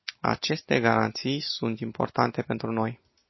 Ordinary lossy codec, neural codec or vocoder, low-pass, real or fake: MP3, 24 kbps; none; 7.2 kHz; real